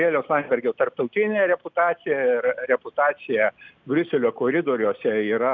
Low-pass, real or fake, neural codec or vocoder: 7.2 kHz; real; none